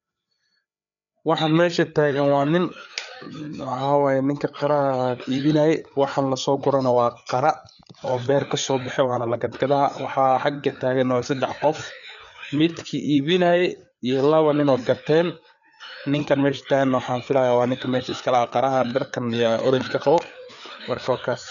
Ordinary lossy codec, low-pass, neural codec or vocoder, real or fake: none; 7.2 kHz; codec, 16 kHz, 4 kbps, FreqCodec, larger model; fake